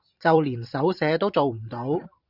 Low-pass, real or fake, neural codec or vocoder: 5.4 kHz; fake; vocoder, 44.1 kHz, 128 mel bands every 512 samples, BigVGAN v2